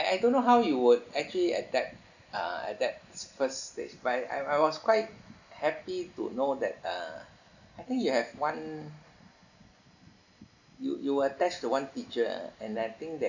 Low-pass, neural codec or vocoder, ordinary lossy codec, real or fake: 7.2 kHz; none; none; real